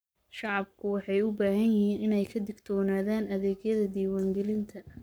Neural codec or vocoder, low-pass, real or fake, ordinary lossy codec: codec, 44.1 kHz, 7.8 kbps, Pupu-Codec; none; fake; none